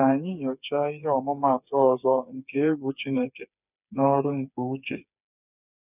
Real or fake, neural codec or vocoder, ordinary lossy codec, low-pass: fake; codec, 16 kHz, 4 kbps, FreqCodec, smaller model; none; 3.6 kHz